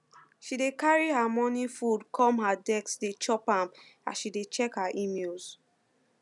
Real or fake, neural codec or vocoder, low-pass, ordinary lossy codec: real; none; 10.8 kHz; none